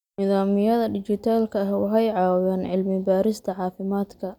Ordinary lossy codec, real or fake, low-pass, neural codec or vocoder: none; real; 19.8 kHz; none